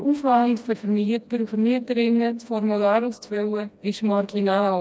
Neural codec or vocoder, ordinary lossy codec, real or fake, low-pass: codec, 16 kHz, 1 kbps, FreqCodec, smaller model; none; fake; none